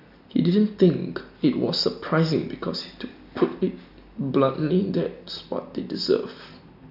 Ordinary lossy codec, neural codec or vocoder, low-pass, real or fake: none; none; 5.4 kHz; real